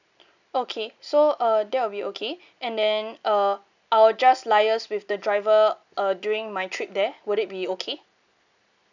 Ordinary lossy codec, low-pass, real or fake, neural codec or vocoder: none; 7.2 kHz; real; none